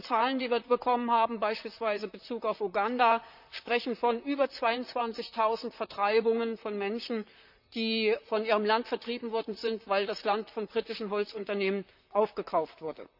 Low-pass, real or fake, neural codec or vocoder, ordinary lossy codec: 5.4 kHz; fake; vocoder, 44.1 kHz, 128 mel bands, Pupu-Vocoder; none